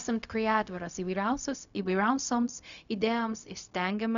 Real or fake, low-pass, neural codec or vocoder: fake; 7.2 kHz; codec, 16 kHz, 0.4 kbps, LongCat-Audio-Codec